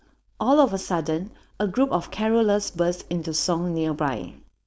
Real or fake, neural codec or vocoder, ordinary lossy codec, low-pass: fake; codec, 16 kHz, 4.8 kbps, FACodec; none; none